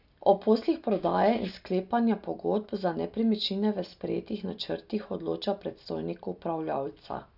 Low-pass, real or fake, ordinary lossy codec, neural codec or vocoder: 5.4 kHz; real; none; none